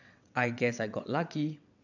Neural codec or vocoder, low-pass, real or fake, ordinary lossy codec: vocoder, 22.05 kHz, 80 mel bands, WaveNeXt; 7.2 kHz; fake; none